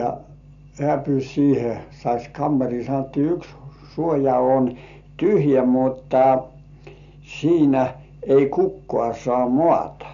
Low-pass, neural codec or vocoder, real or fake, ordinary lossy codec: 7.2 kHz; none; real; none